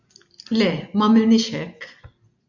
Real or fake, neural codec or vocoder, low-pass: real; none; 7.2 kHz